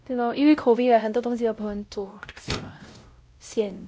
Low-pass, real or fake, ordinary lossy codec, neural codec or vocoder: none; fake; none; codec, 16 kHz, 0.5 kbps, X-Codec, WavLM features, trained on Multilingual LibriSpeech